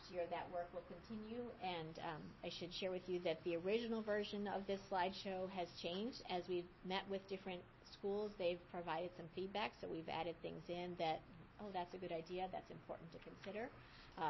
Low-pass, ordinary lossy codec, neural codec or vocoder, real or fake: 7.2 kHz; MP3, 24 kbps; none; real